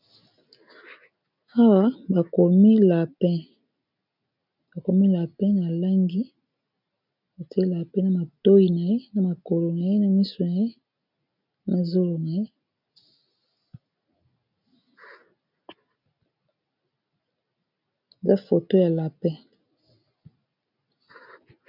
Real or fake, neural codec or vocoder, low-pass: real; none; 5.4 kHz